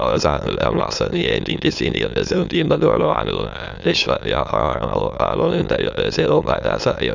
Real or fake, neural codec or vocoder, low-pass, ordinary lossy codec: fake; autoencoder, 22.05 kHz, a latent of 192 numbers a frame, VITS, trained on many speakers; 7.2 kHz; none